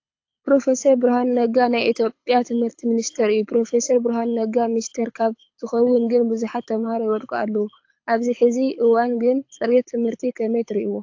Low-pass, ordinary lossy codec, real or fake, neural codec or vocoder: 7.2 kHz; AAC, 48 kbps; fake; codec, 24 kHz, 6 kbps, HILCodec